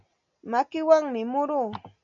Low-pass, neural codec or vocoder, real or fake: 7.2 kHz; none; real